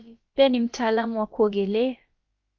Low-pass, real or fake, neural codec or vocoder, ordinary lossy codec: 7.2 kHz; fake; codec, 16 kHz, about 1 kbps, DyCAST, with the encoder's durations; Opus, 24 kbps